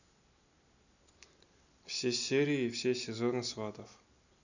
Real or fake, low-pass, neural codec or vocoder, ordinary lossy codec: real; 7.2 kHz; none; none